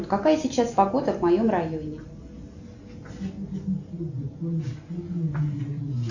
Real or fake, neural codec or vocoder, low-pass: real; none; 7.2 kHz